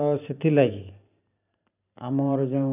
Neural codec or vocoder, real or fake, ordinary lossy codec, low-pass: vocoder, 44.1 kHz, 128 mel bands every 512 samples, BigVGAN v2; fake; none; 3.6 kHz